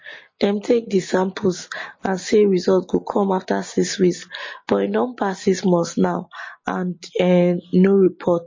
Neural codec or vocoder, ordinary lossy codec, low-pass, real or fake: none; MP3, 32 kbps; 7.2 kHz; real